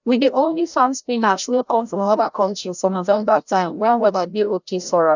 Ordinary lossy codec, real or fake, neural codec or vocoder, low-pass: none; fake; codec, 16 kHz, 0.5 kbps, FreqCodec, larger model; 7.2 kHz